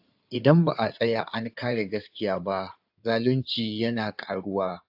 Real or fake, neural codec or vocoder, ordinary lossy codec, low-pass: fake; codec, 16 kHz in and 24 kHz out, 2.2 kbps, FireRedTTS-2 codec; AAC, 48 kbps; 5.4 kHz